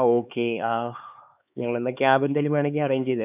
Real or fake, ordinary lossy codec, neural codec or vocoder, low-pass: fake; none; codec, 16 kHz, 4 kbps, X-Codec, HuBERT features, trained on LibriSpeech; 3.6 kHz